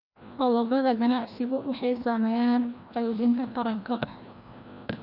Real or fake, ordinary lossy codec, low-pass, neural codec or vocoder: fake; none; 5.4 kHz; codec, 16 kHz, 1 kbps, FreqCodec, larger model